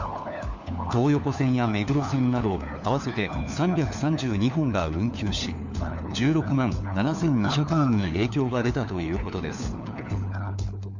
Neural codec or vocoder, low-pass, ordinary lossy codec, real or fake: codec, 16 kHz, 4 kbps, FunCodec, trained on LibriTTS, 50 frames a second; 7.2 kHz; none; fake